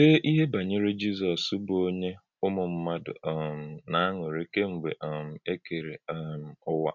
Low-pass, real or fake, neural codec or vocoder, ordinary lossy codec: 7.2 kHz; real; none; none